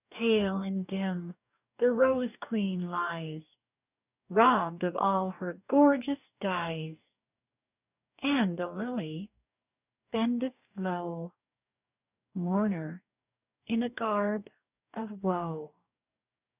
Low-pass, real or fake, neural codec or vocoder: 3.6 kHz; fake; codec, 44.1 kHz, 2.6 kbps, DAC